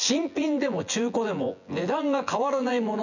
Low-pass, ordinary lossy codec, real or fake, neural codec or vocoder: 7.2 kHz; none; fake; vocoder, 24 kHz, 100 mel bands, Vocos